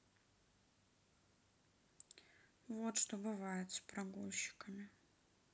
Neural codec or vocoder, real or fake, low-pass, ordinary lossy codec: none; real; none; none